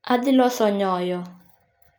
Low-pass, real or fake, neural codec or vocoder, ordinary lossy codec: none; real; none; none